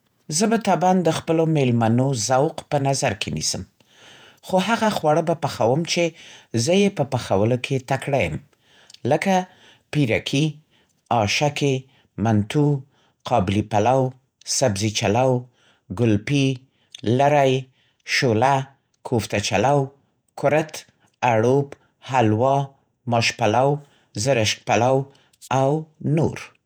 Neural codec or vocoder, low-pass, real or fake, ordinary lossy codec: vocoder, 48 kHz, 128 mel bands, Vocos; none; fake; none